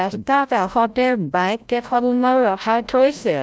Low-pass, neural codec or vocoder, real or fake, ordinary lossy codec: none; codec, 16 kHz, 0.5 kbps, FreqCodec, larger model; fake; none